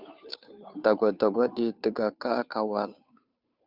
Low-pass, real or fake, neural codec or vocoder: 5.4 kHz; fake; codec, 16 kHz, 2 kbps, FunCodec, trained on Chinese and English, 25 frames a second